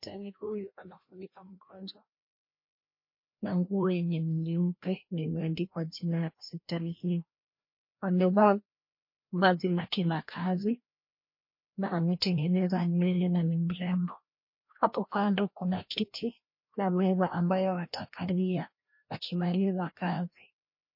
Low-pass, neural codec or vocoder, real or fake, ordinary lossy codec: 5.4 kHz; codec, 16 kHz, 1 kbps, FreqCodec, larger model; fake; MP3, 32 kbps